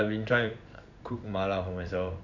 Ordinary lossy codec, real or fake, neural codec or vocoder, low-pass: none; fake; codec, 16 kHz in and 24 kHz out, 1 kbps, XY-Tokenizer; 7.2 kHz